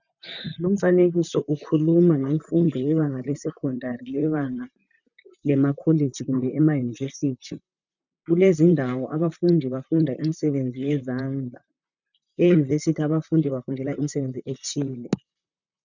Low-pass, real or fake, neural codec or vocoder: 7.2 kHz; fake; vocoder, 44.1 kHz, 128 mel bands, Pupu-Vocoder